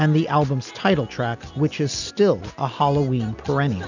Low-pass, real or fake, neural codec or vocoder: 7.2 kHz; real; none